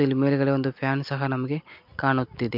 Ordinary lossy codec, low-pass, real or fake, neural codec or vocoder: MP3, 48 kbps; 5.4 kHz; real; none